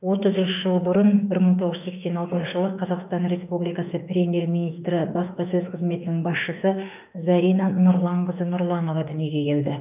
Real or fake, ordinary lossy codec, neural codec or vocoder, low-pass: fake; none; autoencoder, 48 kHz, 32 numbers a frame, DAC-VAE, trained on Japanese speech; 3.6 kHz